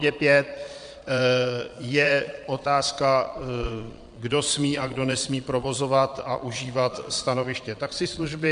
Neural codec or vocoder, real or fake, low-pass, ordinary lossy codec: vocoder, 22.05 kHz, 80 mel bands, Vocos; fake; 9.9 kHz; MP3, 64 kbps